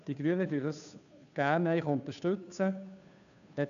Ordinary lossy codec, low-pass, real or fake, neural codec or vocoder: none; 7.2 kHz; fake; codec, 16 kHz, 2 kbps, FunCodec, trained on Chinese and English, 25 frames a second